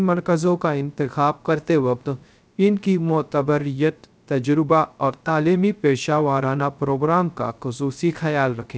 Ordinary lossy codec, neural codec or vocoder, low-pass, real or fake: none; codec, 16 kHz, 0.3 kbps, FocalCodec; none; fake